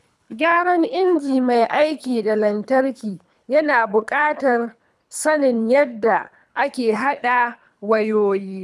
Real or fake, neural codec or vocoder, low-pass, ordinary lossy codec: fake; codec, 24 kHz, 3 kbps, HILCodec; none; none